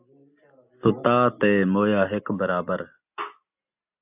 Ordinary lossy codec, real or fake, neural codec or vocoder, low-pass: AAC, 32 kbps; real; none; 3.6 kHz